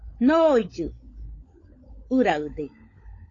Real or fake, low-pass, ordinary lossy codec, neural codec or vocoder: fake; 7.2 kHz; AAC, 32 kbps; codec, 16 kHz, 16 kbps, FunCodec, trained on LibriTTS, 50 frames a second